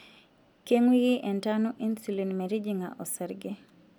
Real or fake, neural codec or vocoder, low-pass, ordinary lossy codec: real; none; 19.8 kHz; none